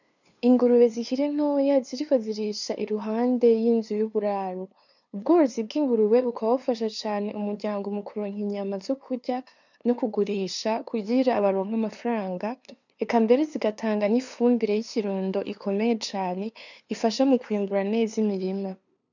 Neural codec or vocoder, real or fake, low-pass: codec, 16 kHz, 2 kbps, FunCodec, trained on LibriTTS, 25 frames a second; fake; 7.2 kHz